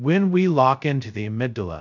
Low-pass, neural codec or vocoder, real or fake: 7.2 kHz; codec, 16 kHz, 0.2 kbps, FocalCodec; fake